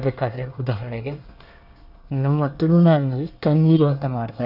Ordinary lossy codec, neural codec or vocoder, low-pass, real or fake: none; codec, 24 kHz, 1 kbps, SNAC; 5.4 kHz; fake